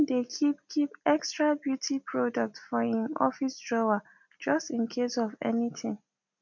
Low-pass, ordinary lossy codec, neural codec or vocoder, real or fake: 7.2 kHz; MP3, 64 kbps; none; real